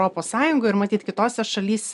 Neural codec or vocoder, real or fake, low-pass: none; real; 10.8 kHz